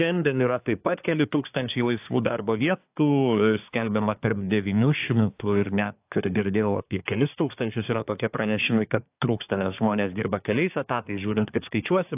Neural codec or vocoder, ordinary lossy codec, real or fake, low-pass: codec, 24 kHz, 1 kbps, SNAC; AAC, 32 kbps; fake; 3.6 kHz